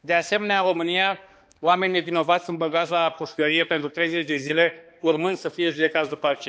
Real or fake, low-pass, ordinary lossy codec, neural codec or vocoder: fake; none; none; codec, 16 kHz, 2 kbps, X-Codec, HuBERT features, trained on balanced general audio